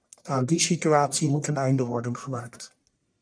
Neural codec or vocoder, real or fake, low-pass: codec, 44.1 kHz, 1.7 kbps, Pupu-Codec; fake; 9.9 kHz